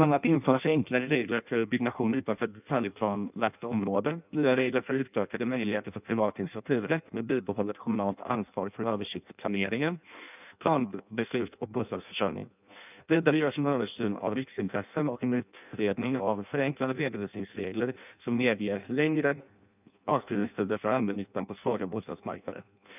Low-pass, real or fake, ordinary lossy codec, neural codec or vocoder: 3.6 kHz; fake; none; codec, 16 kHz in and 24 kHz out, 0.6 kbps, FireRedTTS-2 codec